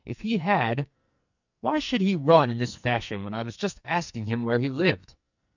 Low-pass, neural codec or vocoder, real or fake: 7.2 kHz; codec, 44.1 kHz, 2.6 kbps, SNAC; fake